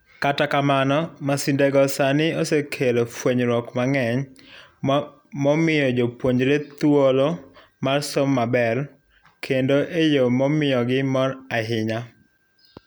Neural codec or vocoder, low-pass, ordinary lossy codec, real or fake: none; none; none; real